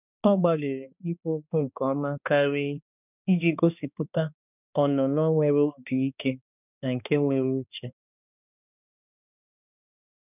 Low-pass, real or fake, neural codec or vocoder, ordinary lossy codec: 3.6 kHz; fake; codec, 16 kHz, 2 kbps, X-Codec, HuBERT features, trained on balanced general audio; none